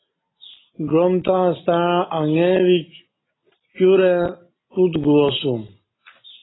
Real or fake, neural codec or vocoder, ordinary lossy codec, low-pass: real; none; AAC, 16 kbps; 7.2 kHz